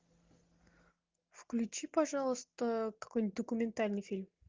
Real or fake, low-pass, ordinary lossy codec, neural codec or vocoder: real; 7.2 kHz; Opus, 24 kbps; none